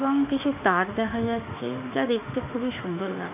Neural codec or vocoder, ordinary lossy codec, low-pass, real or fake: autoencoder, 48 kHz, 32 numbers a frame, DAC-VAE, trained on Japanese speech; none; 3.6 kHz; fake